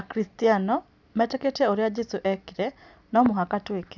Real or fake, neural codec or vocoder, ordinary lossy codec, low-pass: real; none; none; 7.2 kHz